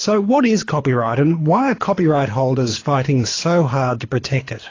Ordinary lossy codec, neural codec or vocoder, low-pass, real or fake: AAC, 32 kbps; codec, 24 kHz, 6 kbps, HILCodec; 7.2 kHz; fake